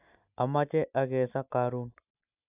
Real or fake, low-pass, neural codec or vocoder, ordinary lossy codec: real; 3.6 kHz; none; AAC, 32 kbps